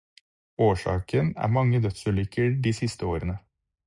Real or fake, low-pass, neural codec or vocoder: real; 10.8 kHz; none